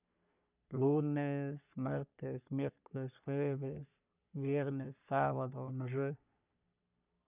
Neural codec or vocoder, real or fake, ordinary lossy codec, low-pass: codec, 44.1 kHz, 3.4 kbps, Pupu-Codec; fake; none; 3.6 kHz